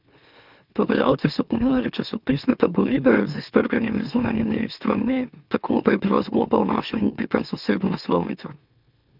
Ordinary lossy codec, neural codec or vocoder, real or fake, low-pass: none; autoencoder, 44.1 kHz, a latent of 192 numbers a frame, MeloTTS; fake; 5.4 kHz